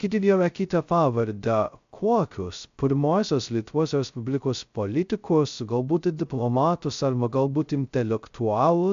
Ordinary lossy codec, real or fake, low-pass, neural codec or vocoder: AAC, 64 kbps; fake; 7.2 kHz; codec, 16 kHz, 0.2 kbps, FocalCodec